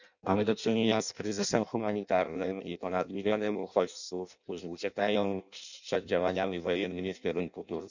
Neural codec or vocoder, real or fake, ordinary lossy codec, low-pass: codec, 16 kHz in and 24 kHz out, 0.6 kbps, FireRedTTS-2 codec; fake; none; 7.2 kHz